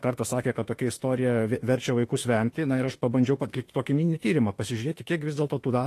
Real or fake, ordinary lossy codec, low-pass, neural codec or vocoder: fake; AAC, 48 kbps; 14.4 kHz; autoencoder, 48 kHz, 32 numbers a frame, DAC-VAE, trained on Japanese speech